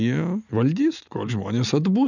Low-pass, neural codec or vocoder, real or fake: 7.2 kHz; none; real